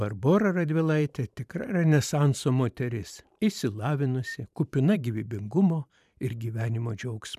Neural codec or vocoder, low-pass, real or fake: vocoder, 44.1 kHz, 128 mel bands every 512 samples, BigVGAN v2; 14.4 kHz; fake